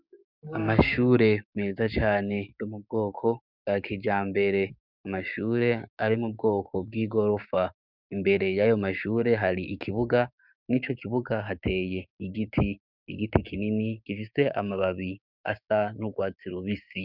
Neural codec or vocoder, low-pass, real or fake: codec, 16 kHz, 6 kbps, DAC; 5.4 kHz; fake